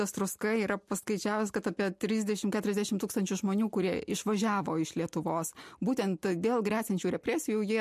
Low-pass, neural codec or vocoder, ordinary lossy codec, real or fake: 14.4 kHz; none; MP3, 64 kbps; real